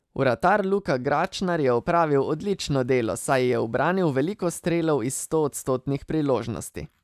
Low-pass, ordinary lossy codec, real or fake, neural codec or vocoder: 14.4 kHz; none; real; none